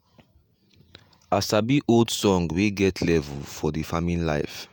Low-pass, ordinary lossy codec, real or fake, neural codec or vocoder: none; none; fake; vocoder, 48 kHz, 128 mel bands, Vocos